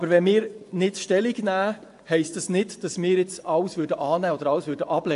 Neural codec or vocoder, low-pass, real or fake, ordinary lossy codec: vocoder, 24 kHz, 100 mel bands, Vocos; 10.8 kHz; fake; AAC, 64 kbps